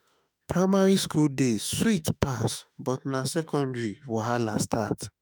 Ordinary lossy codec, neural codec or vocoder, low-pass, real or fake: none; autoencoder, 48 kHz, 32 numbers a frame, DAC-VAE, trained on Japanese speech; none; fake